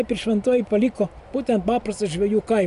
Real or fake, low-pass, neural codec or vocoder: real; 10.8 kHz; none